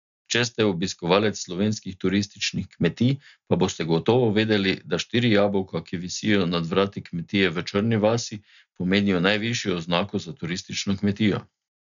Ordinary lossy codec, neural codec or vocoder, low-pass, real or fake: none; none; 7.2 kHz; real